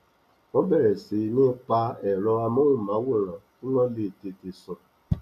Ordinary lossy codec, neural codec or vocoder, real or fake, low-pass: none; none; real; 14.4 kHz